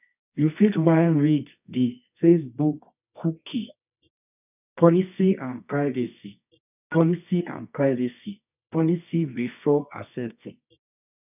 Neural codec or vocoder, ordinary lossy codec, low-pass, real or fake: codec, 24 kHz, 0.9 kbps, WavTokenizer, medium music audio release; none; 3.6 kHz; fake